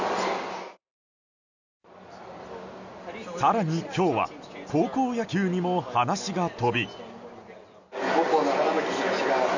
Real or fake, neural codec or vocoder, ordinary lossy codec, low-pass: real; none; none; 7.2 kHz